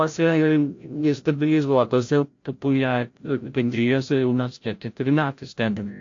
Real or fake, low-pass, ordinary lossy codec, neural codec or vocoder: fake; 7.2 kHz; AAC, 48 kbps; codec, 16 kHz, 0.5 kbps, FreqCodec, larger model